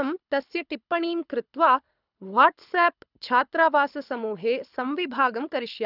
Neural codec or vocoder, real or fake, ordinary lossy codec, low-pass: codec, 24 kHz, 6 kbps, HILCodec; fake; none; 5.4 kHz